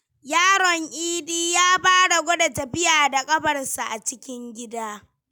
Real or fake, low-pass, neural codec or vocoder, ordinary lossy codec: real; 19.8 kHz; none; none